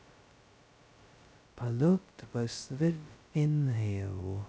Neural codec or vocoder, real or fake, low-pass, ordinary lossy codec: codec, 16 kHz, 0.2 kbps, FocalCodec; fake; none; none